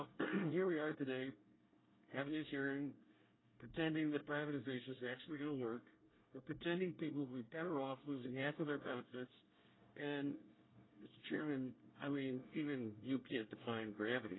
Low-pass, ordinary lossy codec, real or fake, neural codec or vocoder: 7.2 kHz; AAC, 16 kbps; fake; codec, 24 kHz, 1 kbps, SNAC